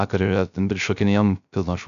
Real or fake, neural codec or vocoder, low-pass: fake; codec, 16 kHz, 0.3 kbps, FocalCodec; 7.2 kHz